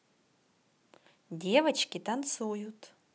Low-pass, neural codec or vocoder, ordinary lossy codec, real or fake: none; none; none; real